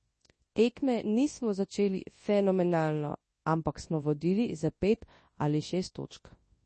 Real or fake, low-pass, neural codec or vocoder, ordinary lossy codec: fake; 10.8 kHz; codec, 24 kHz, 0.9 kbps, WavTokenizer, large speech release; MP3, 32 kbps